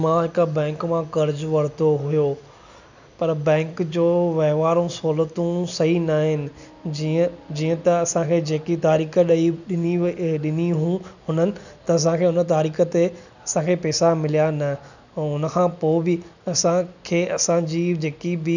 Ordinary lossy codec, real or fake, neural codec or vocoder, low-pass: none; real; none; 7.2 kHz